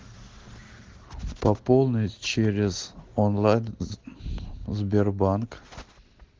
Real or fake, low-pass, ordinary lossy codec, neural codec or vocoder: real; 7.2 kHz; Opus, 16 kbps; none